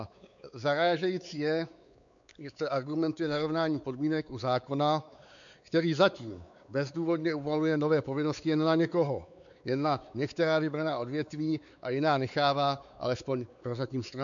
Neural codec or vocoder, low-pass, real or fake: codec, 16 kHz, 4 kbps, X-Codec, WavLM features, trained on Multilingual LibriSpeech; 7.2 kHz; fake